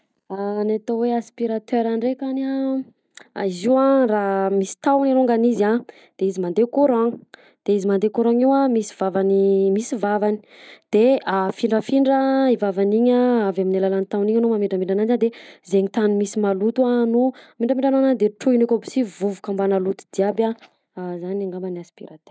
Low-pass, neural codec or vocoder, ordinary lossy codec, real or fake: none; none; none; real